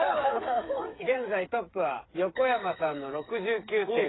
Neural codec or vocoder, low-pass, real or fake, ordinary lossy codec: codec, 16 kHz, 8 kbps, FreqCodec, smaller model; 7.2 kHz; fake; AAC, 16 kbps